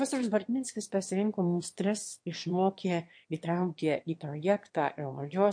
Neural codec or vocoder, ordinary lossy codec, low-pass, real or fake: autoencoder, 22.05 kHz, a latent of 192 numbers a frame, VITS, trained on one speaker; MP3, 48 kbps; 9.9 kHz; fake